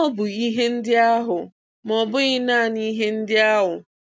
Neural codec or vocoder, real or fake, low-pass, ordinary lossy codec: none; real; none; none